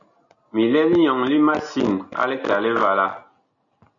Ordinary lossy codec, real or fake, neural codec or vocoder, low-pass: MP3, 96 kbps; real; none; 7.2 kHz